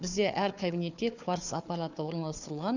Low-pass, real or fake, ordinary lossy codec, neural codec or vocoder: 7.2 kHz; fake; none; codec, 16 kHz, 4 kbps, FunCodec, trained on Chinese and English, 50 frames a second